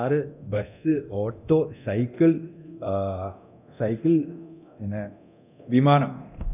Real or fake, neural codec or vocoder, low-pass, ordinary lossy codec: fake; codec, 24 kHz, 0.9 kbps, DualCodec; 3.6 kHz; none